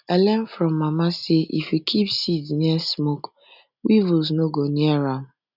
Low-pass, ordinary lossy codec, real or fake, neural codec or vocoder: 5.4 kHz; none; real; none